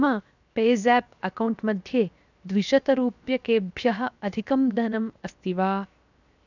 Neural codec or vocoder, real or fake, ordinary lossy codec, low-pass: codec, 16 kHz, 0.7 kbps, FocalCodec; fake; none; 7.2 kHz